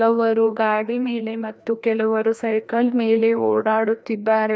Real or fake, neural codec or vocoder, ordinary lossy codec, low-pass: fake; codec, 16 kHz, 1 kbps, FreqCodec, larger model; none; none